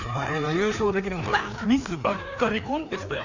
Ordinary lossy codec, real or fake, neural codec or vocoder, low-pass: none; fake; codec, 16 kHz, 2 kbps, FreqCodec, larger model; 7.2 kHz